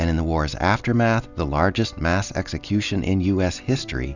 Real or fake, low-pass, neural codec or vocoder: real; 7.2 kHz; none